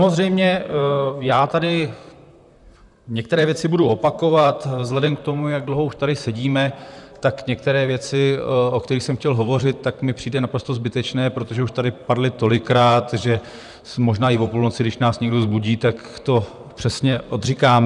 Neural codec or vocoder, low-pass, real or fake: vocoder, 44.1 kHz, 128 mel bands, Pupu-Vocoder; 10.8 kHz; fake